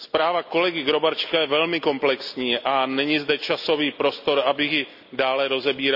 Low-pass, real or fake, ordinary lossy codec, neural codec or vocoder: 5.4 kHz; real; none; none